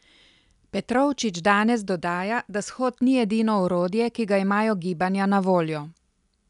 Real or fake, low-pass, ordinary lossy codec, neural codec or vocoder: real; 10.8 kHz; none; none